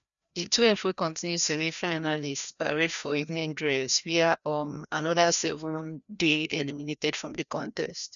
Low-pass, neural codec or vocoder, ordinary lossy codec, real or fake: 7.2 kHz; codec, 16 kHz, 1 kbps, FreqCodec, larger model; none; fake